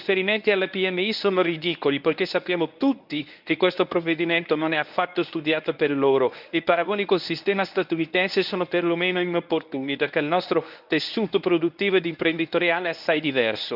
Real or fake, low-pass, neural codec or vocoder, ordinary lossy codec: fake; 5.4 kHz; codec, 24 kHz, 0.9 kbps, WavTokenizer, medium speech release version 1; none